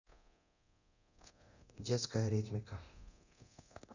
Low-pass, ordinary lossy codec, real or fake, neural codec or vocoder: 7.2 kHz; none; fake; codec, 24 kHz, 0.9 kbps, DualCodec